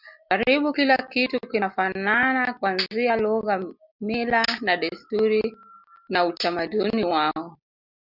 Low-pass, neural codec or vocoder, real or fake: 5.4 kHz; none; real